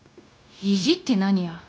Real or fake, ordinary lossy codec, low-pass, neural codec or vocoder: fake; none; none; codec, 16 kHz, 0.9 kbps, LongCat-Audio-Codec